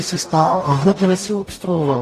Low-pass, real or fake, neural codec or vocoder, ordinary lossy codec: 14.4 kHz; fake; codec, 44.1 kHz, 0.9 kbps, DAC; AAC, 48 kbps